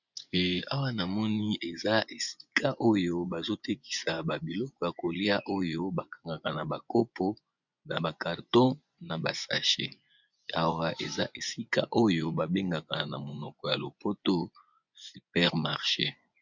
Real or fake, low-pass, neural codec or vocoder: fake; 7.2 kHz; vocoder, 24 kHz, 100 mel bands, Vocos